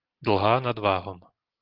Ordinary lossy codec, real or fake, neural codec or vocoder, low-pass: Opus, 16 kbps; real; none; 5.4 kHz